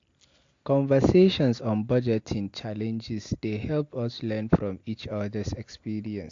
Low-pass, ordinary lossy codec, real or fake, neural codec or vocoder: 7.2 kHz; AAC, 48 kbps; real; none